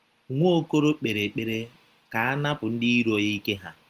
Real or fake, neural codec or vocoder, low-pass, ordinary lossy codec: real; none; 14.4 kHz; Opus, 24 kbps